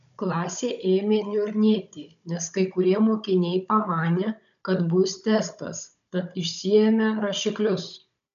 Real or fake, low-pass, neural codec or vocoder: fake; 7.2 kHz; codec, 16 kHz, 16 kbps, FunCodec, trained on Chinese and English, 50 frames a second